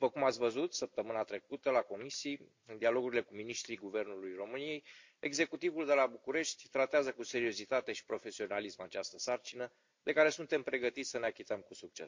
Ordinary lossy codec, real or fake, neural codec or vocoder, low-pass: MP3, 48 kbps; real; none; 7.2 kHz